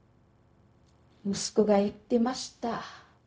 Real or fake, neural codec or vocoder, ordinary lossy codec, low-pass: fake; codec, 16 kHz, 0.4 kbps, LongCat-Audio-Codec; none; none